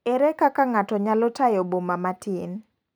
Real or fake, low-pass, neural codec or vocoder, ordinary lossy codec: real; none; none; none